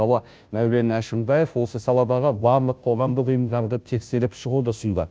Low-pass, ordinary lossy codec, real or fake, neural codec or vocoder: none; none; fake; codec, 16 kHz, 0.5 kbps, FunCodec, trained on Chinese and English, 25 frames a second